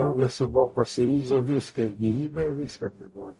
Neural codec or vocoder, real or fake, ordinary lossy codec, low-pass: codec, 44.1 kHz, 0.9 kbps, DAC; fake; MP3, 48 kbps; 14.4 kHz